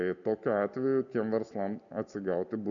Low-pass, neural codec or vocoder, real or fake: 7.2 kHz; none; real